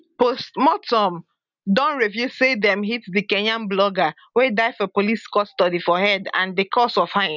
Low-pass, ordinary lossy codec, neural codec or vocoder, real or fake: 7.2 kHz; none; none; real